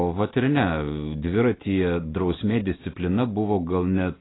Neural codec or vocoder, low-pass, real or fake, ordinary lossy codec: none; 7.2 kHz; real; AAC, 16 kbps